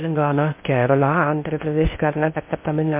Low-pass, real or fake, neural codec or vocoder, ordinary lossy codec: 3.6 kHz; fake; codec, 16 kHz in and 24 kHz out, 0.6 kbps, FocalCodec, streaming, 2048 codes; MP3, 24 kbps